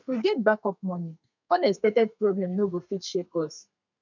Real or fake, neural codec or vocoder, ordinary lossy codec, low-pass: fake; codec, 32 kHz, 1.9 kbps, SNAC; none; 7.2 kHz